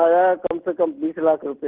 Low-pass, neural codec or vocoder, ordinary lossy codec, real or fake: 3.6 kHz; none; Opus, 16 kbps; real